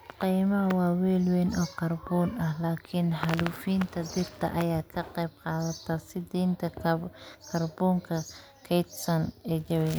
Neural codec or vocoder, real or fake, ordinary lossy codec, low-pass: none; real; none; none